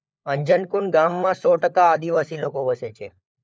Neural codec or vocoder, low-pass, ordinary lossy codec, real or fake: codec, 16 kHz, 4 kbps, FunCodec, trained on LibriTTS, 50 frames a second; none; none; fake